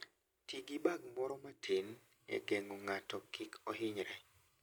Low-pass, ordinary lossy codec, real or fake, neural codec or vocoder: none; none; real; none